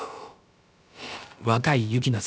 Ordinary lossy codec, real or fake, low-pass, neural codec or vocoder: none; fake; none; codec, 16 kHz, about 1 kbps, DyCAST, with the encoder's durations